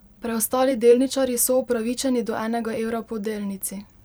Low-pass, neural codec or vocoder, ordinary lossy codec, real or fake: none; vocoder, 44.1 kHz, 128 mel bands every 512 samples, BigVGAN v2; none; fake